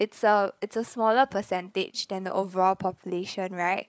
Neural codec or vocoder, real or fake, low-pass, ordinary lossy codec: codec, 16 kHz, 8 kbps, FreqCodec, larger model; fake; none; none